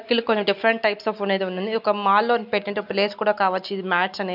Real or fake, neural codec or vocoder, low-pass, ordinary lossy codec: real; none; 5.4 kHz; none